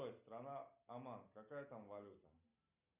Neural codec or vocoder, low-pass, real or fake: none; 3.6 kHz; real